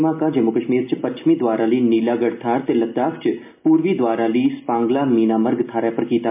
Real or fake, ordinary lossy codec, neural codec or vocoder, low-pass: real; MP3, 32 kbps; none; 3.6 kHz